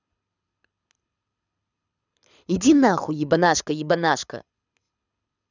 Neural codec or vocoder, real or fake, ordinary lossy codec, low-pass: codec, 24 kHz, 6 kbps, HILCodec; fake; none; 7.2 kHz